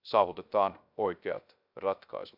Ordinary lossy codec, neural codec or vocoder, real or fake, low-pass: none; codec, 16 kHz, 0.3 kbps, FocalCodec; fake; 5.4 kHz